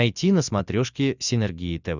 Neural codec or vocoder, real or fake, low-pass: none; real; 7.2 kHz